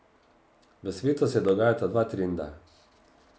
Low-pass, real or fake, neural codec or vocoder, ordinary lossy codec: none; real; none; none